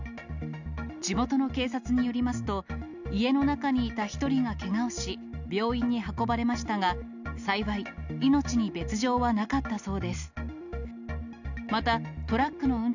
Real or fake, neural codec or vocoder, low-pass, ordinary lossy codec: real; none; 7.2 kHz; none